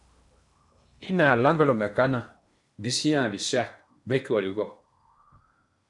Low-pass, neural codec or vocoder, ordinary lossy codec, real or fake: 10.8 kHz; codec, 16 kHz in and 24 kHz out, 0.8 kbps, FocalCodec, streaming, 65536 codes; MP3, 96 kbps; fake